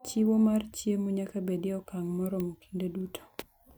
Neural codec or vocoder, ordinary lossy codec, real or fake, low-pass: none; none; real; none